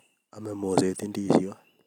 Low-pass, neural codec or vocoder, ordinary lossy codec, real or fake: 19.8 kHz; none; none; real